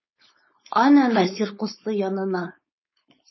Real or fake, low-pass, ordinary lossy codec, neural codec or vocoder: fake; 7.2 kHz; MP3, 24 kbps; codec, 16 kHz, 4.8 kbps, FACodec